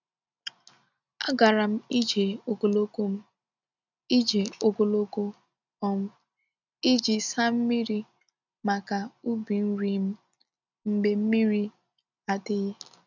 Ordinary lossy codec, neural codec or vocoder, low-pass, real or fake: none; none; 7.2 kHz; real